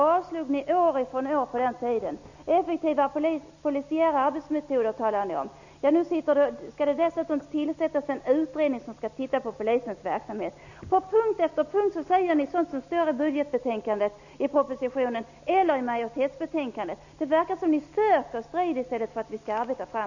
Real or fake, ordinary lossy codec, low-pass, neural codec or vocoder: real; none; 7.2 kHz; none